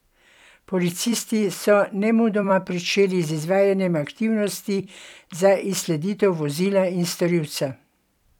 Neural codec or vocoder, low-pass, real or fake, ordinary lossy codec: vocoder, 44.1 kHz, 128 mel bands every 256 samples, BigVGAN v2; 19.8 kHz; fake; none